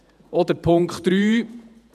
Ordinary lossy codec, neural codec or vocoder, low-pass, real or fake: none; vocoder, 48 kHz, 128 mel bands, Vocos; 14.4 kHz; fake